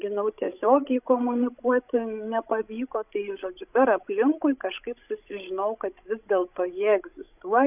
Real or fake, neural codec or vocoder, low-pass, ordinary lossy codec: fake; codec, 16 kHz, 16 kbps, FreqCodec, larger model; 3.6 kHz; AAC, 32 kbps